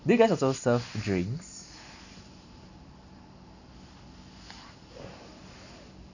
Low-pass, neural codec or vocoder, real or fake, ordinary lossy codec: 7.2 kHz; none; real; none